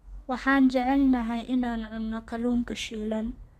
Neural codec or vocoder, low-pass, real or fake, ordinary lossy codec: codec, 32 kHz, 1.9 kbps, SNAC; 14.4 kHz; fake; none